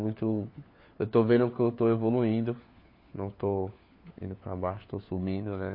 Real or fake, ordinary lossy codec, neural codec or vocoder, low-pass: fake; MP3, 32 kbps; codec, 16 kHz, 4 kbps, FunCodec, trained on LibriTTS, 50 frames a second; 5.4 kHz